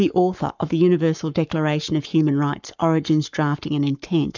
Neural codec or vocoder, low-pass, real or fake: codec, 44.1 kHz, 7.8 kbps, Pupu-Codec; 7.2 kHz; fake